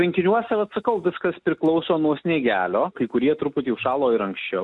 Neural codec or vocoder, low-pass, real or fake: none; 10.8 kHz; real